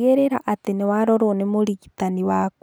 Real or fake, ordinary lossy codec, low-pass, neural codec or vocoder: real; none; none; none